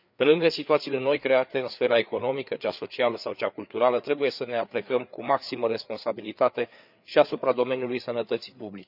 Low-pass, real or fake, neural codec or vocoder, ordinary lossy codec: 5.4 kHz; fake; codec, 16 kHz, 4 kbps, FreqCodec, larger model; none